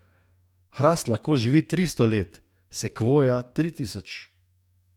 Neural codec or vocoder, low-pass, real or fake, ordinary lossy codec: codec, 44.1 kHz, 2.6 kbps, DAC; 19.8 kHz; fake; none